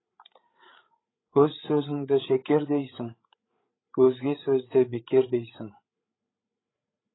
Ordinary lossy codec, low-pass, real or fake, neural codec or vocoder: AAC, 16 kbps; 7.2 kHz; fake; codec, 16 kHz, 8 kbps, FreqCodec, larger model